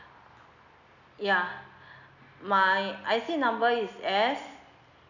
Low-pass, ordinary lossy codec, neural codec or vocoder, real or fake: 7.2 kHz; none; none; real